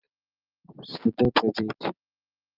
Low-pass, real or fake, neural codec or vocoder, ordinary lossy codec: 5.4 kHz; real; none; Opus, 32 kbps